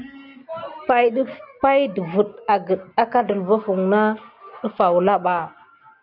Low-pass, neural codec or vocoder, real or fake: 5.4 kHz; none; real